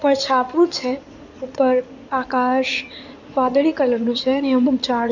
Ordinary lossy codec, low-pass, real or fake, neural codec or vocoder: none; 7.2 kHz; fake; codec, 16 kHz in and 24 kHz out, 2.2 kbps, FireRedTTS-2 codec